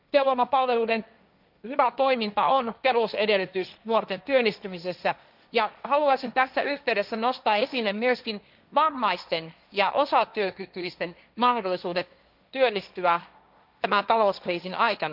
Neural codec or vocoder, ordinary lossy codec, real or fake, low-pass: codec, 16 kHz, 1.1 kbps, Voila-Tokenizer; none; fake; 5.4 kHz